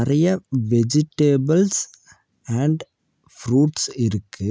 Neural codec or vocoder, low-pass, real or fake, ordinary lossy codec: none; none; real; none